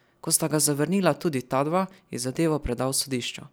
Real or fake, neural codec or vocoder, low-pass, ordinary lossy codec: real; none; none; none